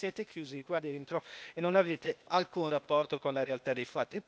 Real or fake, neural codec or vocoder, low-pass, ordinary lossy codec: fake; codec, 16 kHz, 0.8 kbps, ZipCodec; none; none